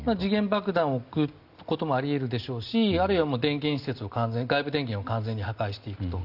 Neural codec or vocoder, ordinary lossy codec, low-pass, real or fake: none; Opus, 64 kbps; 5.4 kHz; real